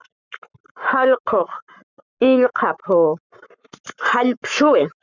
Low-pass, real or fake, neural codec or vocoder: 7.2 kHz; fake; codec, 44.1 kHz, 7.8 kbps, Pupu-Codec